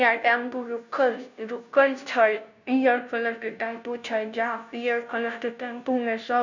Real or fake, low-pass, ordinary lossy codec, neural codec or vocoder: fake; 7.2 kHz; none; codec, 16 kHz, 0.5 kbps, FunCodec, trained on Chinese and English, 25 frames a second